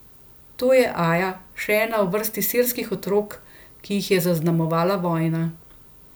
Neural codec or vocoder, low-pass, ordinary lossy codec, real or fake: none; none; none; real